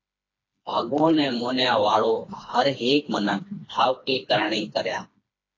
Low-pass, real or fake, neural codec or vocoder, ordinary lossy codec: 7.2 kHz; fake; codec, 16 kHz, 2 kbps, FreqCodec, smaller model; AAC, 32 kbps